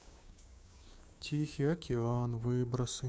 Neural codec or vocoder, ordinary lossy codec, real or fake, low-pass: codec, 16 kHz, 6 kbps, DAC; none; fake; none